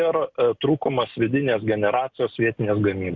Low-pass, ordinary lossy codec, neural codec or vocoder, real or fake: 7.2 kHz; Opus, 64 kbps; none; real